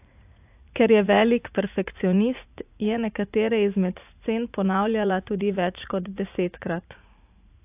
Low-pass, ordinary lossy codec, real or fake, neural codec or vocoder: 3.6 kHz; AAC, 32 kbps; fake; vocoder, 44.1 kHz, 80 mel bands, Vocos